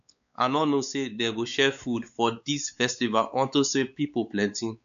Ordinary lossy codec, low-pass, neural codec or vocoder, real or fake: AAC, 96 kbps; 7.2 kHz; codec, 16 kHz, 4 kbps, X-Codec, WavLM features, trained on Multilingual LibriSpeech; fake